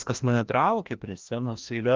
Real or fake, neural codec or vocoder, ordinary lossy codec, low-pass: fake; codec, 16 kHz, 1 kbps, FreqCodec, larger model; Opus, 24 kbps; 7.2 kHz